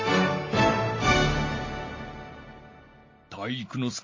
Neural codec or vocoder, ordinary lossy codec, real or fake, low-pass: none; MP3, 32 kbps; real; 7.2 kHz